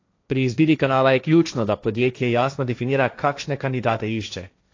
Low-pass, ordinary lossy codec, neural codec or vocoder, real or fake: 7.2 kHz; AAC, 48 kbps; codec, 16 kHz, 1.1 kbps, Voila-Tokenizer; fake